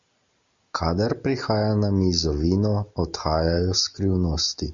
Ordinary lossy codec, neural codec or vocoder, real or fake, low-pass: Opus, 64 kbps; none; real; 7.2 kHz